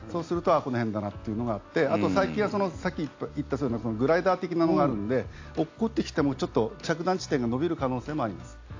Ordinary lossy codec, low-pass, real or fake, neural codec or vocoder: AAC, 48 kbps; 7.2 kHz; real; none